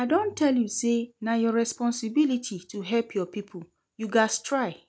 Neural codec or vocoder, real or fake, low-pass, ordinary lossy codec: none; real; none; none